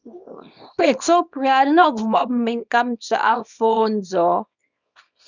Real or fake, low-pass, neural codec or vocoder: fake; 7.2 kHz; codec, 24 kHz, 0.9 kbps, WavTokenizer, small release